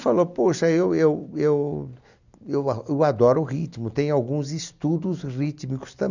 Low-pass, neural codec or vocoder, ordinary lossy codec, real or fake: 7.2 kHz; none; none; real